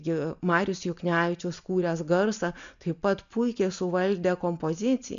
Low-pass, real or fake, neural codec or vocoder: 7.2 kHz; real; none